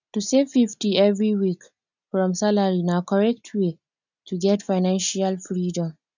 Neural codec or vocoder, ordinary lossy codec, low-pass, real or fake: none; none; 7.2 kHz; real